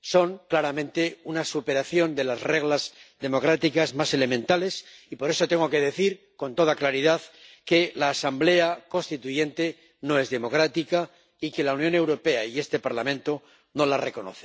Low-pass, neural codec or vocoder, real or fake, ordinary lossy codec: none; none; real; none